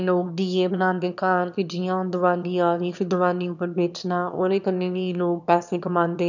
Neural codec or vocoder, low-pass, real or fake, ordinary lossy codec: autoencoder, 22.05 kHz, a latent of 192 numbers a frame, VITS, trained on one speaker; 7.2 kHz; fake; none